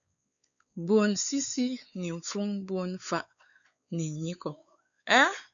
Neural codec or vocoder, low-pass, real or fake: codec, 16 kHz, 4 kbps, X-Codec, WavLM features, trained on Multilingual LibriSpeech; 7.2 kHz; fake